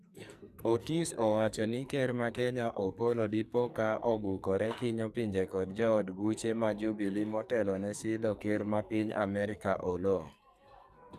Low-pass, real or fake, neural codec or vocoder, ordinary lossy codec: 14.4 kHz; fake; codec, 44.1 kHz, 2.6 kbps, SNAC; none